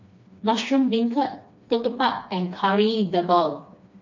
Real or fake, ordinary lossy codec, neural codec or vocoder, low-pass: fake; MP3, 48 kbps; codec, 16 kHz, 2 kbps, FreqCodec, smaller model; 7.2 kHz